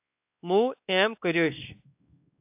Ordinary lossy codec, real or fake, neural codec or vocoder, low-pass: AAC, 32 kbps; fake; codec, 16 kHz, 2 kbps, X-Codec, WavLM features, trained on Multilingual LibriSpeech; 3.6 kHz